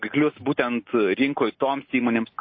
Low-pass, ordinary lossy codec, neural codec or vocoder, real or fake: 7.2 kHz; MP3, 24 kbps; none; real